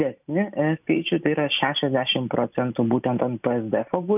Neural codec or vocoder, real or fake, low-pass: none; real; 3.6 kHz